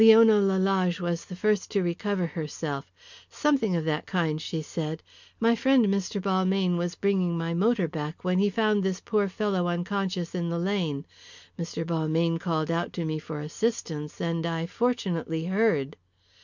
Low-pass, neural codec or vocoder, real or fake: 7.2 kHz; none; real